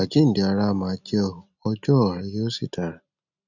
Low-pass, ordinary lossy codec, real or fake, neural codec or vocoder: 7.2 kHz; none; real; none